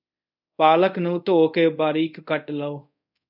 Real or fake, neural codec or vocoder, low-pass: fake; codec, 24 kHz, 0.5 kbps, DualCodec; 5.4 kHz